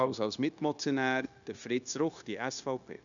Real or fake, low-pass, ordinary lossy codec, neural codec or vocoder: fake; 7.2 kHz; none; codec, 16 kHz, 0.9 kbps, LongCat-Audio-Codec